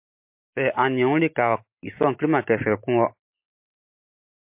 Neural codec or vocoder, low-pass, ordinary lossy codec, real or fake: none; 3.6 kHz; MP3, 32 kbps; real